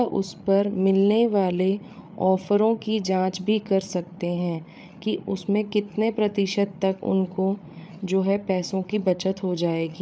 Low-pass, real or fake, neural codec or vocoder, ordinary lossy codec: none; fake; codec, 16 kHz, 8 kbps, FreqCodec, larger model; none